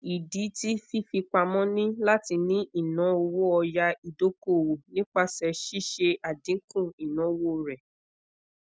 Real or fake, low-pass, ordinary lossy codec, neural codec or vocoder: real; none; none; none